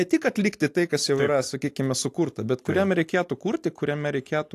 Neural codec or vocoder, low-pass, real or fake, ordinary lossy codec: none; 14.4 kHz; real; AAC, 64 kbps